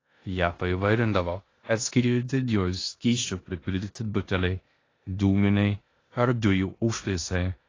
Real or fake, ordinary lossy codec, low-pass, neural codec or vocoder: fake; AAC, 32 kbps; 7.2 kHz; codec, 16 kHz in and 24 kHz out, 0.9 kbps, LongCat-Audio-Codec, four codebook decoder